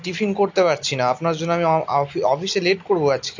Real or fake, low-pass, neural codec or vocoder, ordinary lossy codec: real; 7.2 kHz; none; AAC, 48 kbps